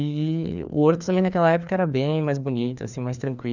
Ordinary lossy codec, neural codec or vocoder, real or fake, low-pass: none; codec, 16 kHz, 2 kbps, FreqCodec, larger model; fake; 7.2 kHz